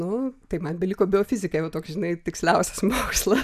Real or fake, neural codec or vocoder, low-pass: real; none; 14.4 kHz